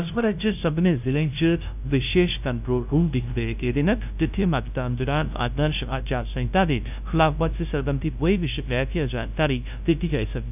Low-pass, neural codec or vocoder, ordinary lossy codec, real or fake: 3.6 kHz; codec, 16 kHz, 0.5 kbps, FunCodec, trained on LibriTTS, 25 frames a second; none; fake